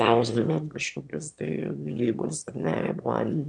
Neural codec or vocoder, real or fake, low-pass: autoencoder, 22.05 kHz, a latent of 192 numbers a frame, VITS, trained on one speaker; fake; 9.9 kHz